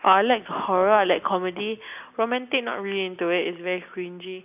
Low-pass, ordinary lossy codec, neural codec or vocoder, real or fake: 3.6 kHz; none; none; real